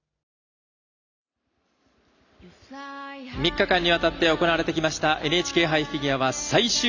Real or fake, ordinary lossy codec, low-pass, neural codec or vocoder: real; none; 7.2 kHz; none